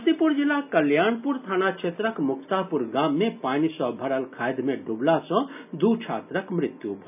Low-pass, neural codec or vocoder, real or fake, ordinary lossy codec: 3.6 kHz; none; real; none